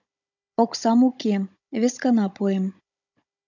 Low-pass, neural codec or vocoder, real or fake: 7.2 kHz; codec, 16 kHz, 16 kbps, FunCodec, trained on Chinese and English, 50 frames a second; fake